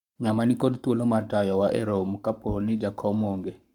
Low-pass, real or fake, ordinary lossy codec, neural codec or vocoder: 19.8 kHz; fake; none; codec, 44.1 kHz, 7.8 kbps, Pupu-Codec